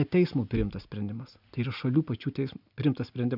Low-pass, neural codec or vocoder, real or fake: 5.4 kHz; none; real